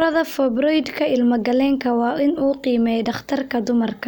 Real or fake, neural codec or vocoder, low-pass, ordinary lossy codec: real; none; none; none